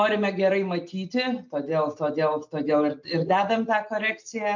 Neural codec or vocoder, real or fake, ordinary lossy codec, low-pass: none; real; MP3, 64 kbps; 7.2 kHz